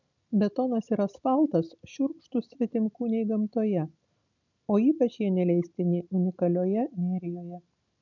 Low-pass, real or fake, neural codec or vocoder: 7.2 kHz; real; none